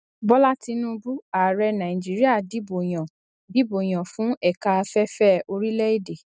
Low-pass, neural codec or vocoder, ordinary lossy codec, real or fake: none; none; none; real